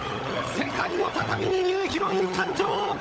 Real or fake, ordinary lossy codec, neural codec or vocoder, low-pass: fake; none; codec, 16 kHz, 16 kbps, FunCodec, trained on LibriTTS, 50 frames a second; none